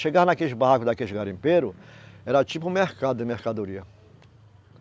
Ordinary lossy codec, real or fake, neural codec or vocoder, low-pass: none; real; none; none